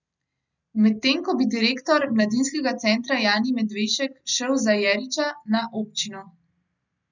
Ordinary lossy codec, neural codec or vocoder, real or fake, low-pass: none; none; real; 7.2 kHz